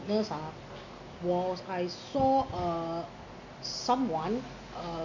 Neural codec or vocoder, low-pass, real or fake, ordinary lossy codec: none; 7.2 kHz; real; none